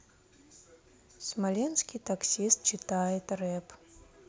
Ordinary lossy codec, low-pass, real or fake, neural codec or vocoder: none; none; real; none